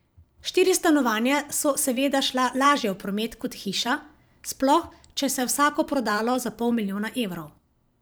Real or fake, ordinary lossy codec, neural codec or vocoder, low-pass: fake; none; vocoder, 44.1 kHz, 128 mel bands every 512 samples, BigVGAN v2; none